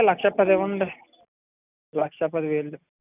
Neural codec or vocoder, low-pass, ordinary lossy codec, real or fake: none; 3.6 kHz; none; real